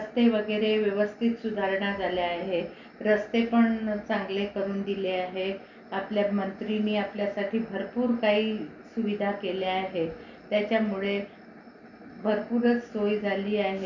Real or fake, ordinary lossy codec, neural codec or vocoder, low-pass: real; none; none; 7.2 kHz